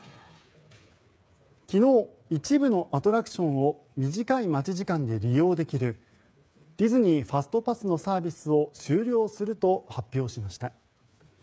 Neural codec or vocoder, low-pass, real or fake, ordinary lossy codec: codec, 16 kHz, 8 kbps, FreqCodec, smaller model; none; fake; none